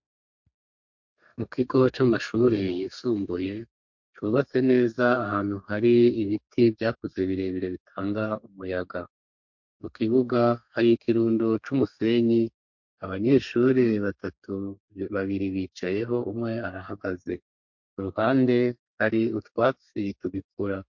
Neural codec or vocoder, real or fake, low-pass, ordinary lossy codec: codec, 32 kHz, 1.9 kbps, SNAC; fake; 7.2 kHz; MP3, 48 kbps